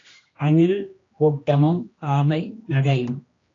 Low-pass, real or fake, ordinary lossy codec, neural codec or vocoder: 7.2 kHz; fake; AAC, 32 kbps; codec, 16 kHz, 1 kbps, X-Codec, HuBERT features, trained on general audio